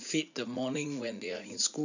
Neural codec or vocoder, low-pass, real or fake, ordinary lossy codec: vocoder, 44.1 kHz, 80 mel bands, Vocos; 7.2 kHz; fake; none